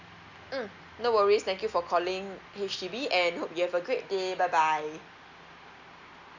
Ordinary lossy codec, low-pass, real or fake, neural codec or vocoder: none; 7.2 kHz; real; none